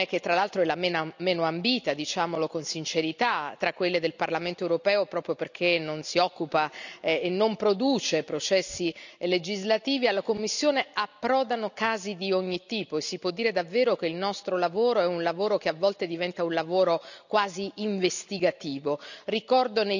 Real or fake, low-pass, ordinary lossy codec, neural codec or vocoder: real; 7.2 kHz; none; none